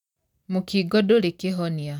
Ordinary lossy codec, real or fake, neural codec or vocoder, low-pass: none; real; none; 19.8 kHz